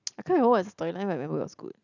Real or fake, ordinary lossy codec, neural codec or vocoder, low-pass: real; none; none; 7.2 kHz